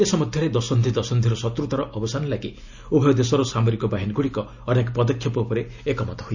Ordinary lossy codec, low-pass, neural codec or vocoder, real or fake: none; 7.2 kHz; none; real